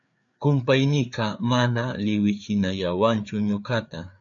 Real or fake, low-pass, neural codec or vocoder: fake; 7.2 kHz; codec, 16 kHz, 4 kbps, FreqCodec, larger model